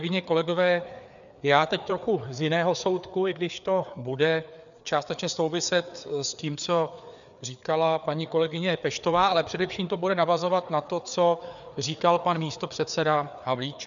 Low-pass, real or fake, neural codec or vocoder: 7.2 kHz; fake; codec, 16 kHz, 4 kbps, FreqCodec, larger model